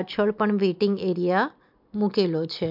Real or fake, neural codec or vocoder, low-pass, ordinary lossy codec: real; none; 5.4 kHz; MP3, 48 kbps